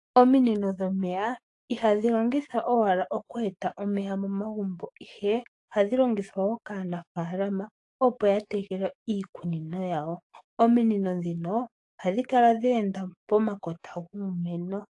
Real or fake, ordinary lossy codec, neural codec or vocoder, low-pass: fake; AAC, 48 kbps; codec, 44.1 kHz, 7.8 kbps, DAC; 10.8 kHz